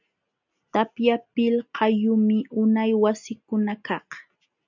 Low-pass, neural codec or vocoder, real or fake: 7.2 kHz; none; real